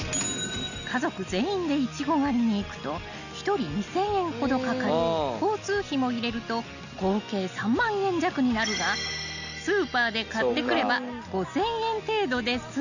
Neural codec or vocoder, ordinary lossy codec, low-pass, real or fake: none; none; 7.2 kHz; real